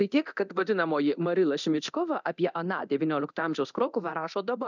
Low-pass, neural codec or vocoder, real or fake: 7.2 kHz; codec, 24 kHz, 0.9 kbps, DualCodec; fake